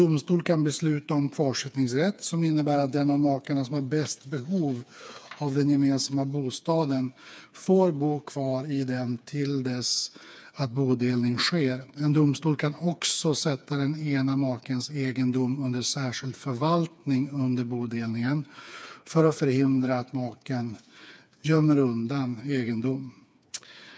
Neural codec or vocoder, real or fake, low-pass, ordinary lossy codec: codec, 16 kHz, 4 kbps, FreqCodec, smaller model; fake; none; none